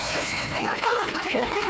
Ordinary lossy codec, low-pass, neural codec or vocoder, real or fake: none; none; codec, 16 kHz, 1 kbps, FunCodec, trained on Chinese and English, 50 frames a second; fake